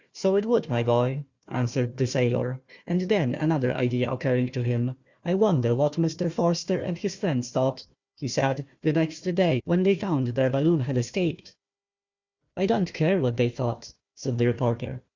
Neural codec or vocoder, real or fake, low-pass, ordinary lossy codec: codec, 16 kHz, 1 kbps, FunCodec, trained on Chinese and English, 50 frames a second; fake; 7.2 kHz; Opus, 64 kbps